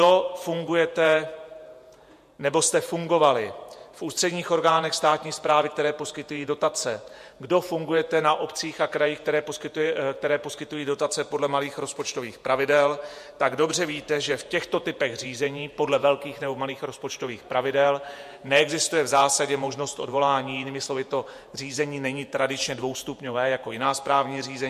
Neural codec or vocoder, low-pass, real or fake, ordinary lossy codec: vocoder, 48 kHz, 128 mel bands, Vocos; 14.4 kHz; fake; MP3, 64 kbps